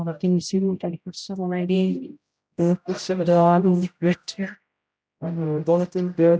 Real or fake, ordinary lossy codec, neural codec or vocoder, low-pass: fake; none; codec, 16 kHz, 0.5 kbps, X-Codec, HuBERT features, trained on general audio; none